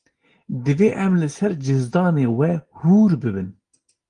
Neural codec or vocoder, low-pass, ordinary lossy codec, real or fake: none; 9.9 kHz; Opus, 24 kbps; real